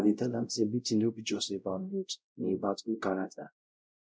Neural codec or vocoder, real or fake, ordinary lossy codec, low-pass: codec, 16 kHz, 0.5 kbps, X-Codec, WavLM features, trained on Multilingual LibriSpeech; fake; none; none